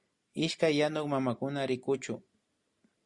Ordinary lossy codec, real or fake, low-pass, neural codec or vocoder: Opus, 64 kbps; real; 10.8 kHz; none